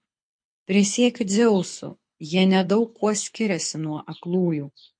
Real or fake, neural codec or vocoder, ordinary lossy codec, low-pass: fake; codec, 24 kHz, 6 kbps, HILCodec; MP3, 48 kbps; 9.9 kHz